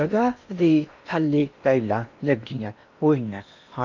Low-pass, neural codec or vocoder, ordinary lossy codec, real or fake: 7.2 kHz; codec, 16 kHz in and 24 kHz out, 0.8 kbps, FocalCodec, streaming, 65536 codes; none; fake